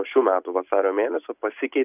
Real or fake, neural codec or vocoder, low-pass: real; none; 3.6 kHz